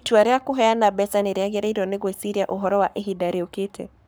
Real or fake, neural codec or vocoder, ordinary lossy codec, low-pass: fake; codec, 44.1 kHz, 7.8 kbps, Pupu-Codec; none; none